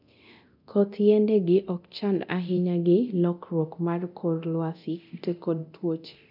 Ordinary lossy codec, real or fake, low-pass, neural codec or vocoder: none; fake; 5.4 kHz; codec, 24 kHz, 0.9 kbps, DualCodec